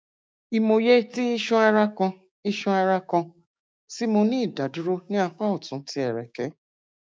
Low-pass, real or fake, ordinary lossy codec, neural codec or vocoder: none; fake; none; codec, 16 kHz, 6 kbps, DAC